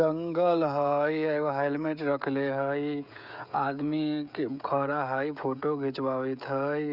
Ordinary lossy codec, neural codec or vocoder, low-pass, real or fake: none; codec, 16 kHz, 16 kbps, FreqCodec, smaller model; 5.4 kHz; fake